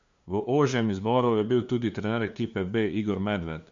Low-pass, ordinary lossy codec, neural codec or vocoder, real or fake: 7.2 kHz; MP3, 64 kbps; codec, 16 kHz, 2 kbps, FunCodec, trained on LibriTTS, 25 frames a second; fake